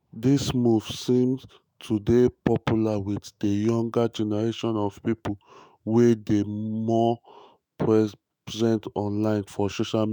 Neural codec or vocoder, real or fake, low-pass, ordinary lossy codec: autoencoder, 48 kHz, 128 numbers a frame, DAC-VAE, trained on Japanese speech; fake; none; none